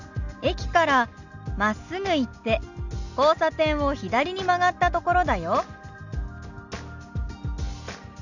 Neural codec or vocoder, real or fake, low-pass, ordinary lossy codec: none; real; 7.2 kHz; none